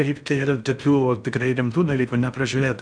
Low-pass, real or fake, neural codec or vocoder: 9.9 kHz; fake; codec, 16 kHz in and 24 kHz out, 0.6 kbps, FocalCodec, streaming, 4096 codes